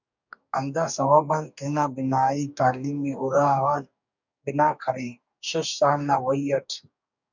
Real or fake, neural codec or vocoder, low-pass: fake; codec, 44.1 kHz, 2.6 kbps, DAC; 7.2 kHz